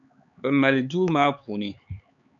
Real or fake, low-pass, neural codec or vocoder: fake; 7.2 kHz; codec, 16 kHz, 4 kbps, X-Codec, HuBERT features, trained on LibriSpeech